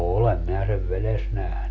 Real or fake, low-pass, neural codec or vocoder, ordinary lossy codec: real; 7.2 kHz; none; MP3, 64 kbps